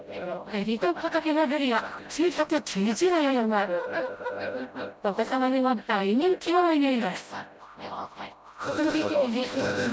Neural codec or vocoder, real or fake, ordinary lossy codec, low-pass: codec, 16 kHz, 0.5 kbps, FreqCodec, smaller model; fake; none; none